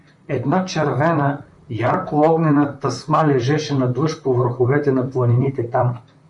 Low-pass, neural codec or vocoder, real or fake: 10.8 kHz; vocoder, 44.1 kHz, 128 mel bands, Pupu-Vocoder; fake